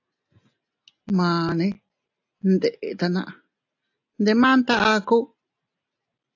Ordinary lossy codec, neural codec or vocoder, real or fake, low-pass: AAC, 48 kbps; none; real; 7.2 kHz